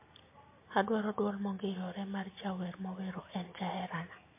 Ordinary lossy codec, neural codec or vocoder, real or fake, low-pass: MP3, 24 kbps; none; real; 3.6 kHz